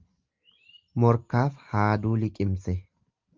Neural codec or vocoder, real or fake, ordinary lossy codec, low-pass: none; real; Opus, 24 kbps; 7.2 kHz